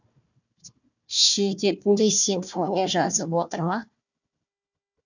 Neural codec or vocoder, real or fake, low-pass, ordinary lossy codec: codec, 16 kHz, 1 kbps, FunCodec, trained on Chinese and English, 50 frames a second; fake; 7.2 kHz; none